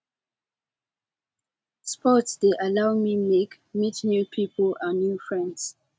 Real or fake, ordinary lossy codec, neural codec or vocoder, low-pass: real; none; none; none